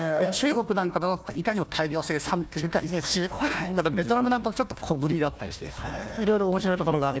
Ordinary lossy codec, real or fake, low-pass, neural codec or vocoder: none; fake; none; codec, 16 kHz, 1 kbps, FunCodec, trained on Chinese and English, 50 frames a second